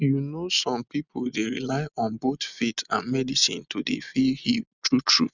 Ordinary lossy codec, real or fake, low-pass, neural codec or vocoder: none; real; 7.2 kHz; none